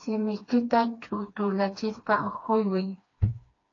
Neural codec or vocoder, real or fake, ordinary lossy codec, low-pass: codec, 16 kHz, 2 kbps, FreqCodec, smaller model; fake; AAC, 32 kbps; 7.2 kHz